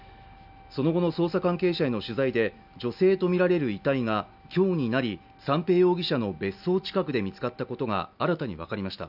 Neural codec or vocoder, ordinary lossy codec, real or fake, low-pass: none; none; real; 5.4 kHz